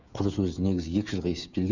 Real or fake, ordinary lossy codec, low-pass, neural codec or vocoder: fake; none; 7.2 kHz; codec, 16 kHz, 16 kbps, FreqCodec, smaller model